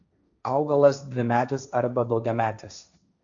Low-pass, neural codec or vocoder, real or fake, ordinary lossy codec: 7.2 kHz; codec, 16 kHz, 1.1 kbps, Voila-Tokenizer; fake; MP3, 64 kbps